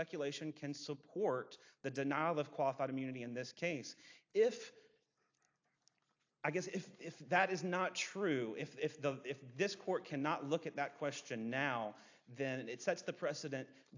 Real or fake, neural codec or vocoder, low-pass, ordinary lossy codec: real; none; 7.2 kHz; AAC, 48 kbps